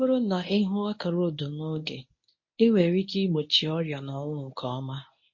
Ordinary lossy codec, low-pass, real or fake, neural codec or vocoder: MP3, 32 kbps; 7.2 kHz; fake; codec, 24 kHz, 0.9 kbps, WavTokenizer, medium speech release version 1